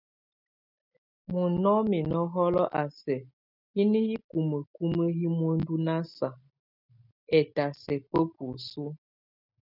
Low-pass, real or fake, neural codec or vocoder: 5.4 kHz; real; none